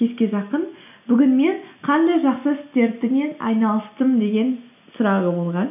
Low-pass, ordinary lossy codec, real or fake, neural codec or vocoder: 3.6 kHz; none; real; none